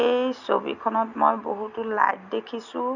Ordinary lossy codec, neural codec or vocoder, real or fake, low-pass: none; none; real; 7.2 kHz